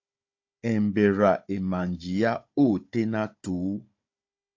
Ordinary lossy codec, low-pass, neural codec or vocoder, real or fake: AAC, 48 kbps; 7.2 kHz; codec, 16 kHz, 16 kbps, FunCodec, trained on Chinese and English, 50 frames a second; fake